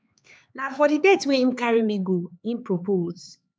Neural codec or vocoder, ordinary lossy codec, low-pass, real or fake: codec, 16 kHz, 4 kbps, X-Codec, HuBERT features, trained on LibriSpeech; none; none; fake